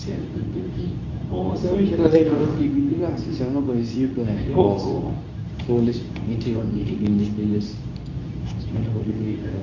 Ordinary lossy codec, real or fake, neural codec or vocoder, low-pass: none; fake; codec, 24 kHz, 0.9 kbps, WavTokenizer, medium speech release version 2; 7.2 kHz